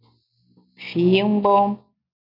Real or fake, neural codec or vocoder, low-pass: real; none; 5.4 kHz